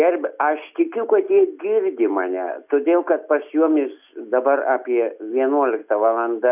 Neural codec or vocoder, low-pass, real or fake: none; 3.6 kHz; real